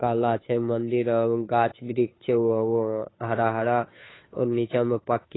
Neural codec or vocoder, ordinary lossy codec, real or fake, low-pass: codec, 16 kHz, 2 kbps, FunCodec, trained on Chinese and English, 25 frames a second; AAC, 16 kbps; fake; 7.2 kHz